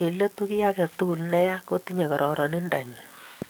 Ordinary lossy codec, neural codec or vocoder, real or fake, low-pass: none; codec, 44.1 kHz, 7.8 kbps, DAC; fake; none